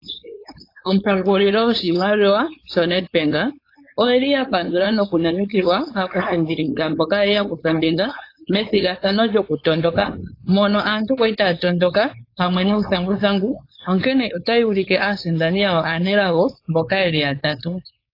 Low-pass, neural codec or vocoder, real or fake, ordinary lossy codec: 5.4 kHz; codec, 16 kHz, 4.8 kbps, FACodec; fake; AAC, 32 kbps